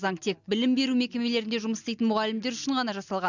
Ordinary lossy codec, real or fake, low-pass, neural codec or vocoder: none; real; 7.2 kHz; none